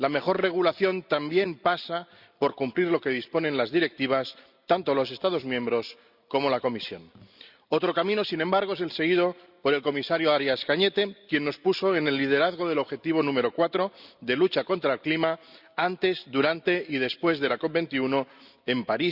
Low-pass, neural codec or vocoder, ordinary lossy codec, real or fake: 5.4 kHz; none; Opus, 64 kbps; real